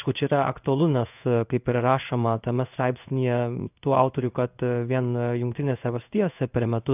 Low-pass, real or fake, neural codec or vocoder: 3.6 kHz; fake; codec, 16 kHz in and 24 kHz out, 1 kbps, XY-Tokenizer